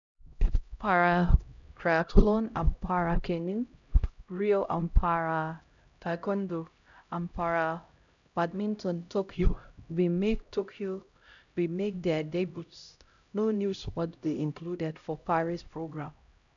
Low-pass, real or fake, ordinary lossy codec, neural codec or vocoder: 7.2 kHz; fake; none; codec, 16 kHz, 0.5 kbps, X-Codec, HuBERT features, trained on LibriSpeech